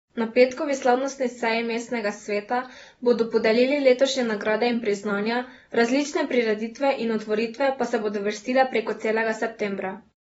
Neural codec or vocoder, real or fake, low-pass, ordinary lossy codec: vocoder, 44.1 kHz, 128 mel bands every 256 samples, BigVGAN v2; fake; 19.8 kHz; AAC, 24 kbps